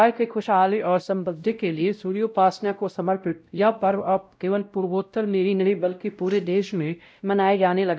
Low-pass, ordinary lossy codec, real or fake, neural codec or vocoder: none; none; fake; codec, 16 kHz, 0.5 kbps, X-Codec, WavLM features, trained on Multilingual LibriSpeech